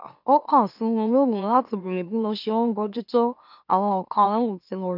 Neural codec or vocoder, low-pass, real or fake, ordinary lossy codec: autoencoder, 44.1 kHz, a latent of 192 numbers a frame, MeloTTS; 5.4 kHz; fake; none